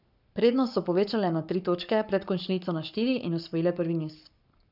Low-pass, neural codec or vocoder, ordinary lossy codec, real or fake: 5.4 kHz; codec, 44.1 kHz, 7.8 kbps, Pupu-Codec; none; fake